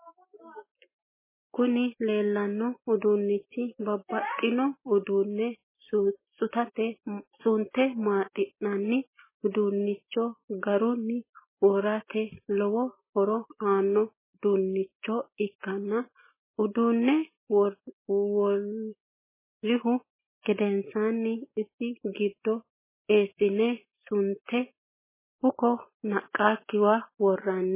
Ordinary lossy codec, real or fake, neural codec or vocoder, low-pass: MP3, 16 kbps; real; none; 3.6 kHz